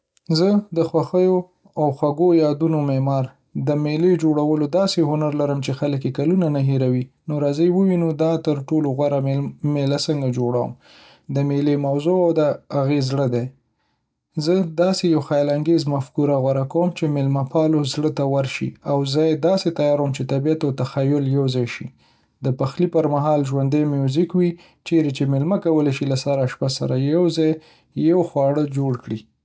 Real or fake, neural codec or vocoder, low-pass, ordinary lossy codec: real; none; none; none